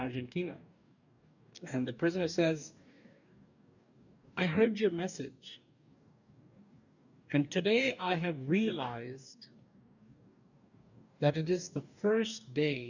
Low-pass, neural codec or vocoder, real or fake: 7.2 kHz; codec, 44.1 kHz, 2.6 kbps, DAC; fake